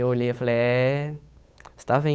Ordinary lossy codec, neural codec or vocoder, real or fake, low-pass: none; codec, 16 kHz, 8 kbps, FunCodec, trained on Chinese and English, 25 frames a second; fake; none